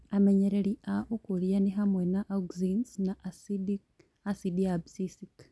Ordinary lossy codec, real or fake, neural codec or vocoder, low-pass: none; real; none; none